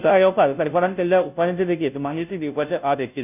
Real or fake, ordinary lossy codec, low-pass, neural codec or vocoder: fake; none; 3.6 kHz; codec, 16 kHz, 0.5 kbps, FunCodec, trained on Chinese and English, 25 frames a second